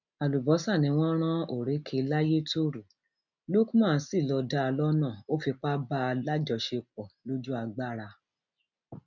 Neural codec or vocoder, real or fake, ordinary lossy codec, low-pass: none; real; none; 7.2 kHz